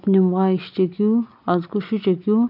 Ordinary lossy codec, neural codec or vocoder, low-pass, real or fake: none; none; 5.4 kHz; real